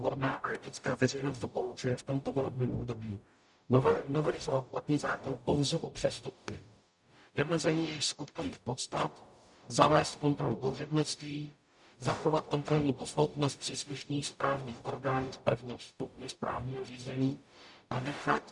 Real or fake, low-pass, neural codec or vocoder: fake; 10.8 kHz; codec, 44.1 kHz, 0.9 kbps, DAC